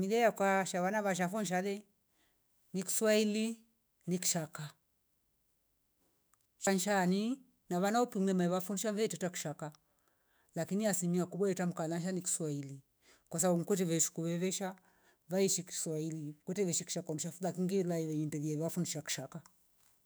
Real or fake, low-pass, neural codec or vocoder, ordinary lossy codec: fake; none; autoencoder, 48 kHz, 128 numbers a frame, DAC-VAE, trained on Japanese speech; none